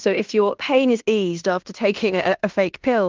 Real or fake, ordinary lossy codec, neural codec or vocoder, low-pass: fake; Opus, 32 kbps; codec, 16 kHz in and 24 kHz out, 0.9 kbps, LongCat-Audio-Codec, fine tuned four codebook decoder; 7.2 kHz